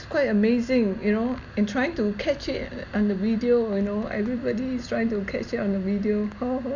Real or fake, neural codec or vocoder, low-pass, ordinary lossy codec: real; none; 7.2 kHz; none